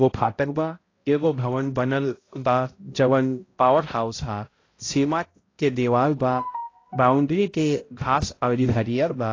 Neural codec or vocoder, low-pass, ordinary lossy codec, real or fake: codec, 16 kHz, 0.5 kbps, X-Codec, HuBERT features, trained on balanced general audio; 7.2 kHz; AAC, 32 kbps; fake